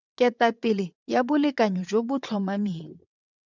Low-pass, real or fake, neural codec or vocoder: 7.2 kHz; fake; vocoder, 22.05 kHz, 80 mel bands, WaveNeXt